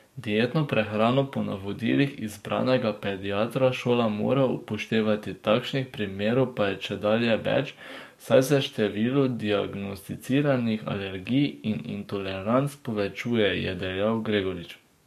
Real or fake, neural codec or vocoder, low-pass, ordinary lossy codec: fake; codec, 44.1 kHz, 7.8 kbps, DAC; 14.4 kHz; MP3, 64 kbps